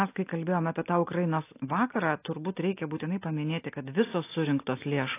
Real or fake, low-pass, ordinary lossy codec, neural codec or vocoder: real; 3.6 kHz; AAC, 24 kbps; none